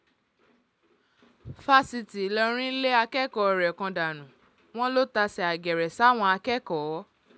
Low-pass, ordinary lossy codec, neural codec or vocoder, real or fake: none; none; none; real